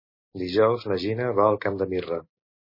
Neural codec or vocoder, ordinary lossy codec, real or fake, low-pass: none; MP3, 24 kbps; real; 5.4 kHz